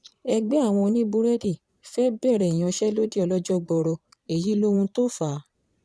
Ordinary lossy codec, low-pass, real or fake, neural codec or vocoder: none; none; fake; vocoder, 22.05 kHz, 80 mel bands, Vocos